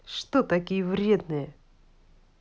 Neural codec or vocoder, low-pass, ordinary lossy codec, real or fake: none; none; none; real